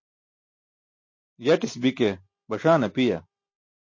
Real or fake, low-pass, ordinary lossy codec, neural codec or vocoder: real; 7.2 kHz; MP3, 32 kbps; none